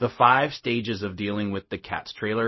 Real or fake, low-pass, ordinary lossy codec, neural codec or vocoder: fake; 7.2 kHz; MP3, 24 kbps; codec, 16 kHz, 0.4 kbps, LongCat-Audio-Codec